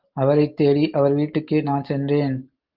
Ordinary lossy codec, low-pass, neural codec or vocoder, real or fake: Opus, 32 kbps; 5.4 kHz; none; real